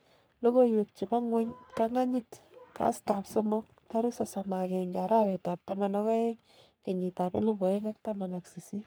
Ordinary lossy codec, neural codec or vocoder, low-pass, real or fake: none; codec, 44.1 kHz, 3.4 kbps, Pupu-Codec; none; fake